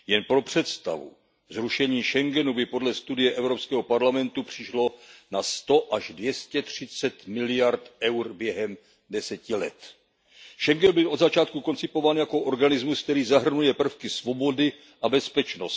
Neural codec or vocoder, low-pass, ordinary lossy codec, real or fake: none; none; none; real